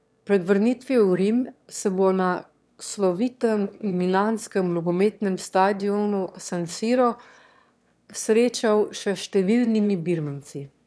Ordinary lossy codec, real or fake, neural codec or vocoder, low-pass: none; fake; autoencoder, 22.05 kHz, a latent of 192 numbers a frame, VITS, trained on one speaker; none